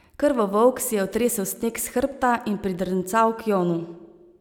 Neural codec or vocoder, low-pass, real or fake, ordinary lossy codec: none; none; real; none